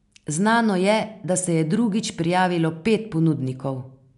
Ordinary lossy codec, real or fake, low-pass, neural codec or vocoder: MP3, 96 kbps; real; 10.8 kHz; none